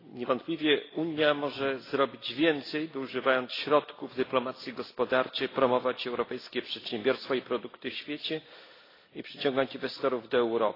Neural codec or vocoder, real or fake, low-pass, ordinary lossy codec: none; real; 5.4 kHz; AAC, 24 kbps